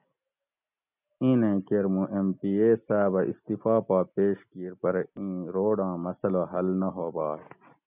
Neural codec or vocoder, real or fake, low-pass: none; real; 3.6 kHz